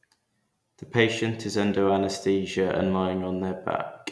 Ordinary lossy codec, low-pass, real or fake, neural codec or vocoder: none; none; real; none